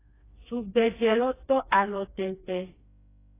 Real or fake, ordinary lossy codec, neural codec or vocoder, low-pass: fake; AAC, 24 kbps; codec, 16 kHz, 2 kbps, FreqCodec, smaller model; 3.6 kHz